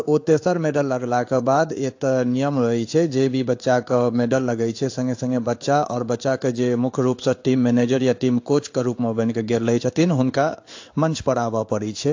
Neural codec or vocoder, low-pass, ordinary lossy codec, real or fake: codec, 16 kHz in and 24 kHz out, 1 kbps, XY-Tokenizer; 7.2 kHz; AAC, 48 kbps; fake